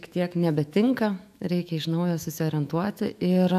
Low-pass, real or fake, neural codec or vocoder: 14.4 kHz; fake; autoencoder, 48 kHz, 128 numbers a frame, DAC-VAE, trained on Japanese speech